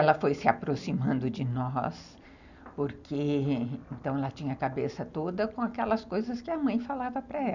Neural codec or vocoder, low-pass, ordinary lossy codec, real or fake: none; 7.2 kHz; none; real